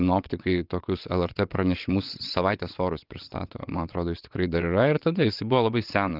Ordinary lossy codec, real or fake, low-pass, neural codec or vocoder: Opus, 24 kbps; fake; 5.4 kHz; vocoder, 22.05 kHz, 80 mel bands, WaveNeXt